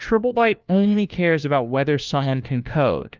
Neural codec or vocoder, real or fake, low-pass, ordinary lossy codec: codec, 16 kHz, 1 kbps, FunCodec, trained on LibriTTS, 50 frames a second; fake; 7.2 kHz; Opus, 24 kbps